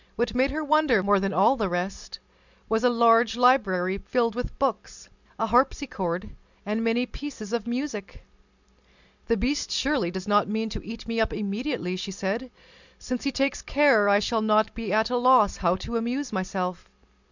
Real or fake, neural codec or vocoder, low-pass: real; none; 7.2 kHz